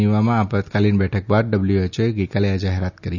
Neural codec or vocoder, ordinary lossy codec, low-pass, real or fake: none; none; 7.2 kHz; real